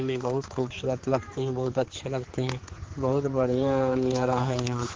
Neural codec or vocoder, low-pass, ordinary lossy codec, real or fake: codec, 16 kHz, 4 kbps, X-Codec, HuBERT features, trained on general audio; 7.2 kHz; Opus, 16 kbps; fake